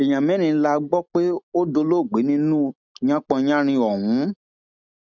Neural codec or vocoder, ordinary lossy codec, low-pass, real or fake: none; none; 7.2 kHz; real